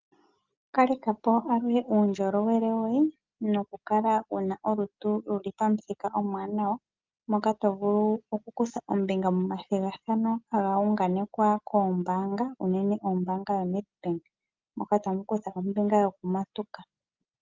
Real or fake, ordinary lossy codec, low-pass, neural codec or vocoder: real; Opus, 32 kbps; 7.2 kHz; none